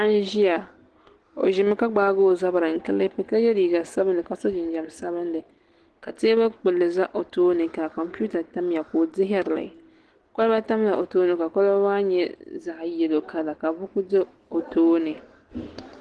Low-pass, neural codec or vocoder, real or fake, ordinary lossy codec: 10.8 kHz; none; real; Opus, 16 kbps